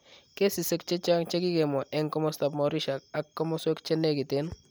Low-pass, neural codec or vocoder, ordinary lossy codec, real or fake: none; none; none; real